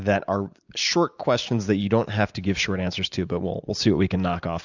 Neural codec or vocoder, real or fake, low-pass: none; real; 7.2 kHz